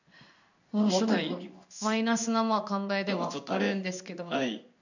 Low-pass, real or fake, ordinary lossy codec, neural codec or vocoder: 7.2 kHz; fake; none; codec, 16 kHz in and 24 kHz out, 1 kbps, XY-Tokenizer